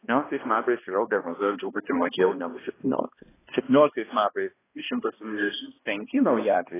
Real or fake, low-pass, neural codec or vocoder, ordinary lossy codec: fake; 3.6 kHz; codec, 16 kHz, 1 kbps, X-Codec, HuBERT features, trained on balanced general audio; AAC, 16 kbps